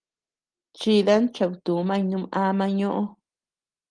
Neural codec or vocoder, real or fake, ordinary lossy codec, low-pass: none; real; Opus, 24 kbps; 9.9 kHz